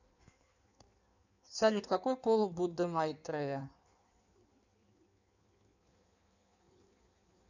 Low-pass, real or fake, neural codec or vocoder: 7.2 kHz; fake; codec, 16 kHz in and 24 kHz out, 1.1 kbps, FireRedTTS-2 codec